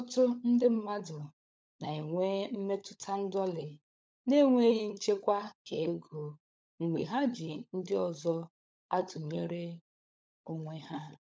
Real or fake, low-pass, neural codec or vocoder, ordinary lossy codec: fake; none; codec, 16 kHz, 16 kbps, FunCodec, trained on LibriTTS, 50 frames a second; none